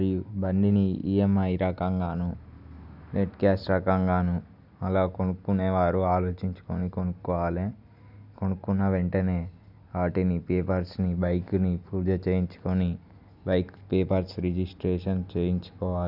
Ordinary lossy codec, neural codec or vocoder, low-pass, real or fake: none; none; 5.4 kHz; real